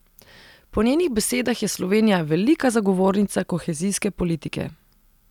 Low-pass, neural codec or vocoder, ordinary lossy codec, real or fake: 19.8 kHz; none; none; real